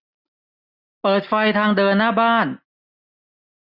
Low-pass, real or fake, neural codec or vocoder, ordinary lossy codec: 5.4 kHz; real; none; none